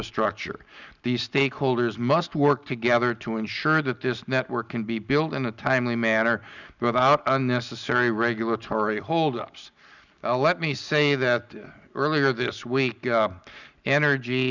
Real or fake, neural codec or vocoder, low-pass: real; none; 7.2 kHz